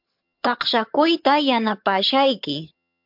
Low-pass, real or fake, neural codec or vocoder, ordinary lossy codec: 5.4 kHz; fake; vocoder, 22.05 kHz, 80 mel bands, HiFi-GAN; MP3, 48 kbps